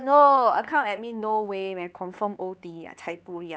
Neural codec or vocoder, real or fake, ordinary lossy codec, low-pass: codec, 16 kHz, 4 kbps, X-Codec, HuBERT features, trained on LibriSpeech; fake; none; none